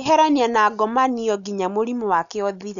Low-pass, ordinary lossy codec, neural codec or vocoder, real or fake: 7.2 kHz; none; none; real